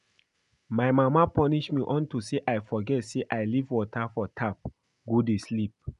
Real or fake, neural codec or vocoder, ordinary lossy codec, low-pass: real; none; none; 10.8 kHz